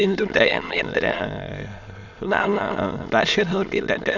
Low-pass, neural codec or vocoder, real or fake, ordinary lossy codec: 7.2 kHz; autoencoder, 22.05 kHz, a latent of 192 numbers a frame, VITS, trained on many speakers; fake; none